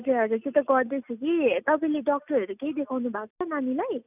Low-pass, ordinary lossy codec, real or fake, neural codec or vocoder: 3.6 kHz; none; real; none